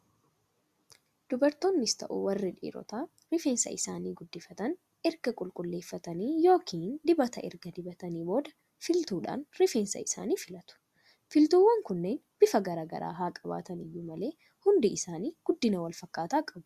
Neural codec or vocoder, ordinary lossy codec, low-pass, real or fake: none; Opus, 64 kbps; 14.4 kHz; real